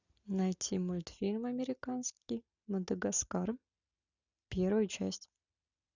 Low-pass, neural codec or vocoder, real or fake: 7.2 kHz; none; real